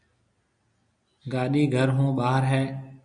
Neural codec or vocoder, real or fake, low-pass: none; real; 9.9 kHz